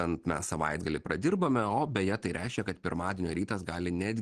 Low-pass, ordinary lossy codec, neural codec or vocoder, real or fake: 9.9 kHz; Opus, 16 kbps; none; real